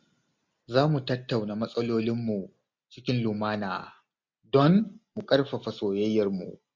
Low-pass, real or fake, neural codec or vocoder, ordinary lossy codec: 7.2 kHz; real; none; MP3, 48 kbps